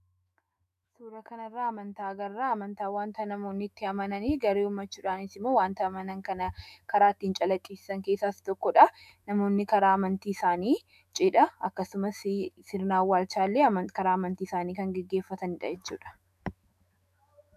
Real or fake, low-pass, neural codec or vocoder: fake; 14.4 kHz; autoencoder, 48 kHz, 128 numbers a frame, DAC-VAE, trained on Japanese speech